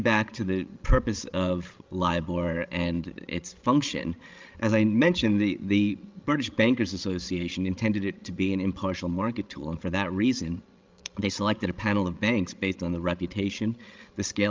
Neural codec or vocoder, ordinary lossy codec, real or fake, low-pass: codec, 16 kHz, 16 kbps, FreqCodec, larger model; Opus, 24 kbps; fake; 7.2 kHz